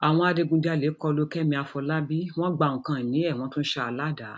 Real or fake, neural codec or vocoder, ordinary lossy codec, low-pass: real; none; none; none